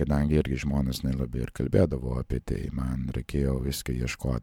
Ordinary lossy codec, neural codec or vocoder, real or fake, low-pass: MP3, 96 kbps; vocoder, 44.1 kHz, 128 mel bands every 512 samples, BigVGAN v2; fake; 19.8 kHz